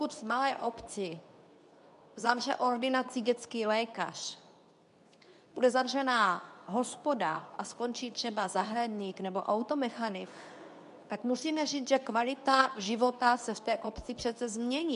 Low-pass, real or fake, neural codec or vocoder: 10.8 kHz; fake; codec, 24 kHz, 0.9 kbps, WavTokenizer, medium speech release version 2